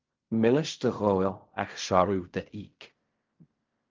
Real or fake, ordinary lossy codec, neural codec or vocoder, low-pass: fake; Opus, 32 kbps; codec, 16 kHz in and 24 kHz out, 0.4 kbps, LongCat-Audio-Codec, fine tuned four codebook decoder; 7.2 kHz